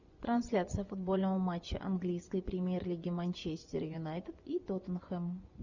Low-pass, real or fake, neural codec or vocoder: 7.2 kHz; fake; vocoder, 24 kHz, 100 mel bands, Vocos